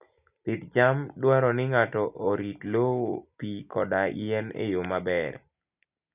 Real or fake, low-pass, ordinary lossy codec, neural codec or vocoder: real; 3.6 kHz; none; none